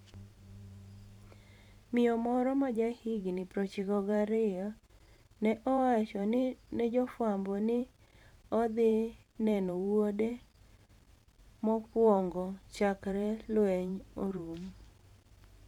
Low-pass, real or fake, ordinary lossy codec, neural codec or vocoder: 19.8 kHz; fake; none; vocoder, 44.1 kHz, 128 mel bands every 512 samples, BigVGAN v2